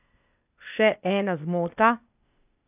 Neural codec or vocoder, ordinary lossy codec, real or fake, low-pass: codec, 16 kHz, 0.8 kbps, ZipCodec; none; fake; 3.6 kHz